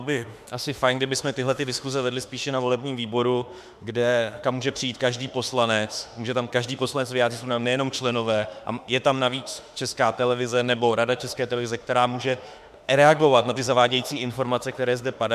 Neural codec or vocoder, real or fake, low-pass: autoencoder, 48 kHz, 32 numbers a frame, DAC-VAE, trained on Japanese speech; fake; 14.4 kHz